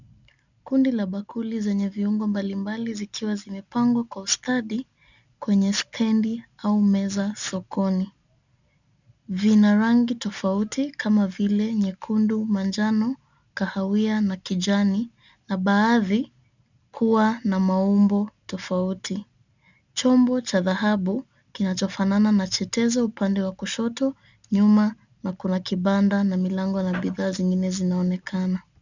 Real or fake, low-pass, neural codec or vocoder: real; 7.2 kHz; none